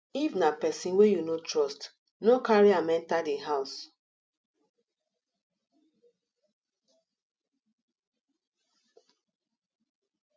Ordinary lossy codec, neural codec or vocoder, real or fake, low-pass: none; none; real; none